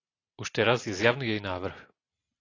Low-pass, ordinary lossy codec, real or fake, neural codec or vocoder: 7.2 kHz; AAC, 32 kbps; real; none